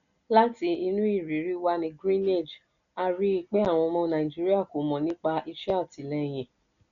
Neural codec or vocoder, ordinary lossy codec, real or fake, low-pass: none; MP3, 96 kbps; real; 7.2 kHz